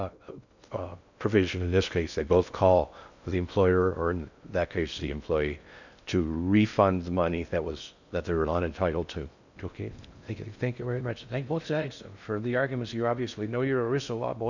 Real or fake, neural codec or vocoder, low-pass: fake; codec, 16 kHz in and 24 kHz out, 0.6 kbps, FocalCodec, streaming, 2048 codes; 7.2 kHz